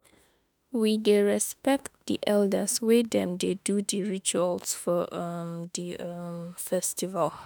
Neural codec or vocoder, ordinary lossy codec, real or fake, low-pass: autoencoder, 48 kHz, 32 numbers a frame, DAC-VAE, trained on Japanese speech; none; fake; none